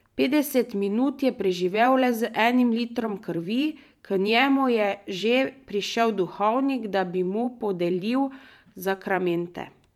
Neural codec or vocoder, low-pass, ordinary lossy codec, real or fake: vocoder, 44.1 kHz, 128 mel bands every 512 samples, BigVGAN v2; 19.8 kHz; none; fake